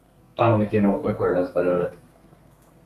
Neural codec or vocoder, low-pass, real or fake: codec, 32 kHz, 1.9 kbps, SNAC; 14.4 kHz; fake